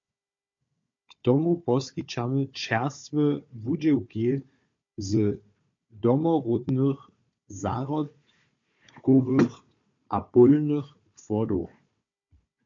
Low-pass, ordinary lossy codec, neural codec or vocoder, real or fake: 7.2 kHz; MP3, 48 kbps; codec, 16 kHz, 4 kbps, FunCodec, trained on Chinese and English, 50 frames a second; fake